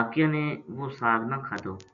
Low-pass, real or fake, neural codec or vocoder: 7.2 kHz; real; none